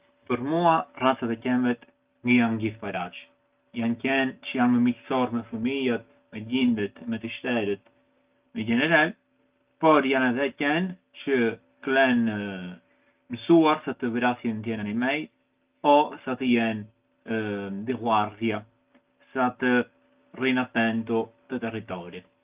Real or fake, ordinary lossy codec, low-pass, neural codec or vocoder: real; Opus, 32 kbps; 3.6 kHz; none